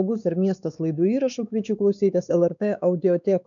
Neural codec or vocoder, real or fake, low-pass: codec, 16 kHz, 4 kbps, X-Codec, WavLM features, trained on Multilingual LibriSpeech; fake; 7.2 kHz